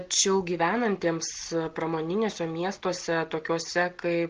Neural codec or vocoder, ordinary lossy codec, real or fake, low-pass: none; Opus, 16 kbps; real; 7.2 kHz